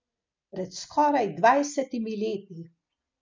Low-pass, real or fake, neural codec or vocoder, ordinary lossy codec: 7.2 kHz; real; none; MP3, 64 kbps